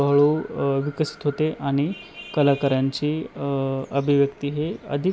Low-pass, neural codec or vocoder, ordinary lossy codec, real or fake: none; none; none; real